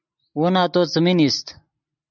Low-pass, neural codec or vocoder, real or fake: 7.2 kHz; none; real